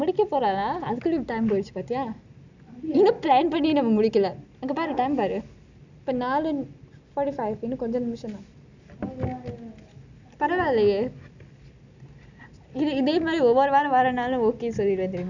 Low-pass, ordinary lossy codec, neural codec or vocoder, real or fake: 7.2 kHz; none; none; real